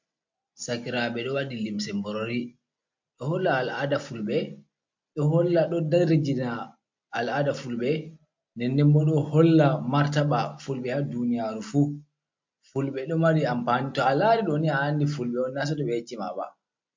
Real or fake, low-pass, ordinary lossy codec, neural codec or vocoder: real; 7.2 kHz; MP3, 48 kbps; none